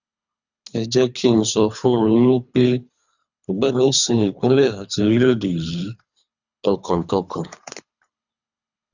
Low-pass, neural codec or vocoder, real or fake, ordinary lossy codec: 7.2 kHz; codec, 24 kHz, 3 kbps, HILCodec; fake; none